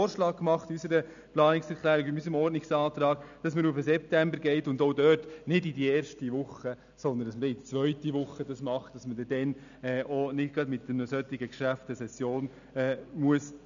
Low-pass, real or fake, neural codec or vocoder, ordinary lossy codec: 7.2 kHz; real; none; none